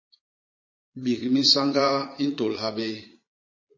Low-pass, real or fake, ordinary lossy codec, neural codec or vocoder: 7.2 kHz; fake; MP3, 32 kbps; vocoder, 22.05 kHz, 80 mel bands, Vocos